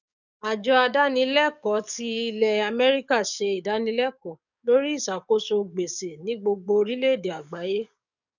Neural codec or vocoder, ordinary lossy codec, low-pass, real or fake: codec, 44.1 kHz, 7.8 kbps, DAC; none; 7.2 kHz; fake